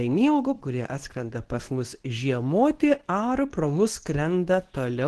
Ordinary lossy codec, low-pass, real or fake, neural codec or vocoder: Opus, 16 kbps; 10.8 kHz; fake; codec, 24 kHz, 0.9 kbps, WavTokenizer, medium speech release version 2